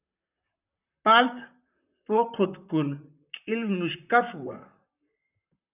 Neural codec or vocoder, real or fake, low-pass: vocoder, 44.1 kHz, 128 mel bands, Pupu-Vocoder; fake; 3.6 kHz